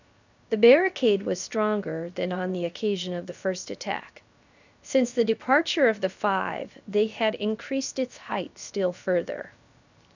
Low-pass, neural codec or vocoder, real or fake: 7.2 kHz; codec, 16 kHz, 0.7 kbps, FocalCodec; fake